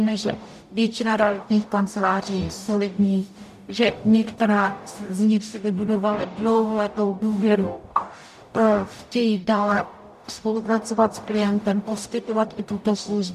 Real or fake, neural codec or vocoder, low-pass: fake; codec, 44.1 kHz, 0.9 kbps, DAC; 14.4 kHz